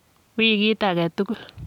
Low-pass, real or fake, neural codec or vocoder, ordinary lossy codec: 19.8 kHz; real; none; none